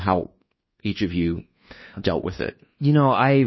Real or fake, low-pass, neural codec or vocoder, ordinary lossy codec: fake; 7.2 kHz; codec, 24 kHz, 1.2 kbps, DualCodec; MP3, 24 kbps